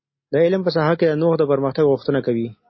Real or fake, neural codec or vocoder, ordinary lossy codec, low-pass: real; none; MP3, 24 kbps; 7.2 kHz